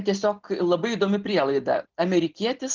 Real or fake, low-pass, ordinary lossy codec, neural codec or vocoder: real; 7.2 kHz; Opus, 32 kbps; none